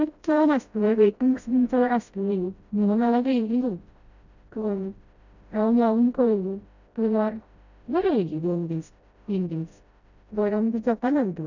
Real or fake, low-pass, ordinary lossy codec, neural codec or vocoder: fake; 7.2 kHz; none; codec, 16 kHz, 0.5 kbps, FreqCodec, smaller model